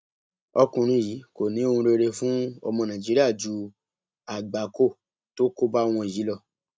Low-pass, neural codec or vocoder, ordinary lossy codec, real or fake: none; none; none; real